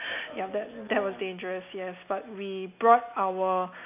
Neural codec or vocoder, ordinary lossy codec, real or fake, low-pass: none; AAC, 32 kbps; real; 3.6 kHz